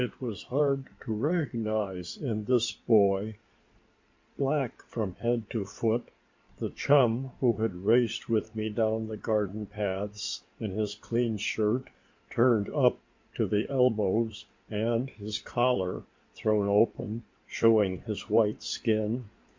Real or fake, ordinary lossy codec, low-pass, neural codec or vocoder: fake; MP3, 48 kbps; 7.2 kHz; codec, 16 kHz in and 24 kHz out, 2.2 kbps, FireRedTTS-2 codec